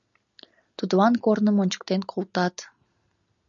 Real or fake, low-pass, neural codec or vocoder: real; 7.2 kHz; none